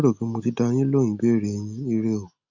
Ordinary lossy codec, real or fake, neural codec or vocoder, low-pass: none; real; none; 7.2 kHz